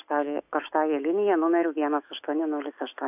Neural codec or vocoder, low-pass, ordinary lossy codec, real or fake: autoencoder, 48 kHz, 128 numbers a frame, DAC-VAE, trained on Japanese speech; 3.6 kHz; AAC, 32 kbps; fake